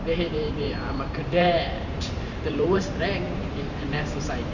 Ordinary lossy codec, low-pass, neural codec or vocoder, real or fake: none; 7.2 kHz; vocoder, 44.1 kHz, 128 mel bands every 512 samples, BigVGAN v2; fake